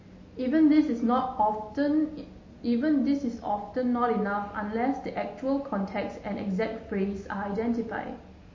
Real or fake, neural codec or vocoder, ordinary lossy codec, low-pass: real; none; MP3, 32 kbps; 7.2 kHz